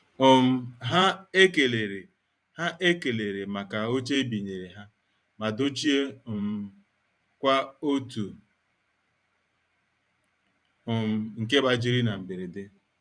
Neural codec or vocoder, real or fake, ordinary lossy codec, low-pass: vocoder, 44.1 kHz, 128 mel bands every 512 samples, BigVGAN v2; fake; none; 9.9 kHz